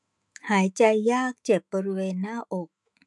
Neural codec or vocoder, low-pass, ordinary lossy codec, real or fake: autoencoder, 48 kHz, 128 numbers a frame, DAC-VAE, trained on Japanese speech; 10.8 kHz; MP3, 96 kbps; fake